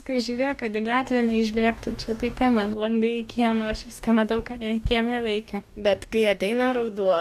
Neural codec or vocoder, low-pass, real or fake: codec, 44.1 kHz, 2.6 kbps, DAC; 14.4 kHz; fake